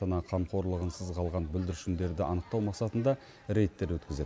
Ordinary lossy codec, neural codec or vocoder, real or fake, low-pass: none; none; real; none